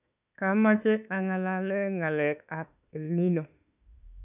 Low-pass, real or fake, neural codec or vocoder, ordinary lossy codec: 3.6 kHz; fake; autoencoder, 48 kHz, 32 numbers a frame, DAC-VAE, trained on Japanese speech; none